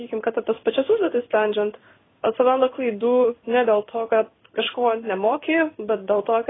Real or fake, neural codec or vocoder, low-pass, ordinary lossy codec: fake; codec, 16 kHz in and 24 kHz out, 1 kbps, XY-Tokenizer; 7.2 kHz; AAC, 16 kbps